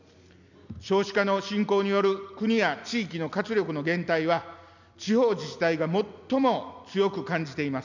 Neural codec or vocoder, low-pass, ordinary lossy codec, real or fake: none; 7.2 kHz; none; real